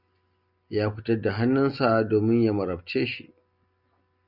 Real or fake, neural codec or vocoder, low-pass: real; none; 5.4 kHz